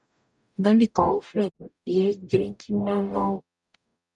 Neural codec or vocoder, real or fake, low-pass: codec, 44.1 kHz, 0.9 kbps, DAC; fake; 10.8 kHz